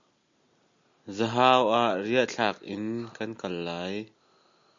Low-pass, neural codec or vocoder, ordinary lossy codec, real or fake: 7.2 kHz; none; MP3, 64 kbps; real